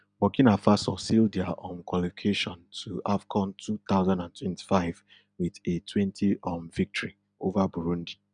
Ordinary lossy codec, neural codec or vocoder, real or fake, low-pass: none; none; real; 9.9 kHz